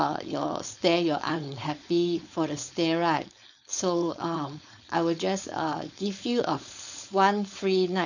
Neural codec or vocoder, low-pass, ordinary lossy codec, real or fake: codec, 16 kHz, 4.8 kbps, FACodec; 7.2 kHz; none; fake